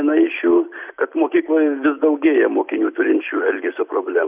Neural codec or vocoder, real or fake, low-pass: none; real; 3.6 kHz